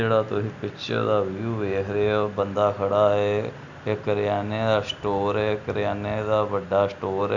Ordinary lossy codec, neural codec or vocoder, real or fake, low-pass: none; none; real; 7.2 kHz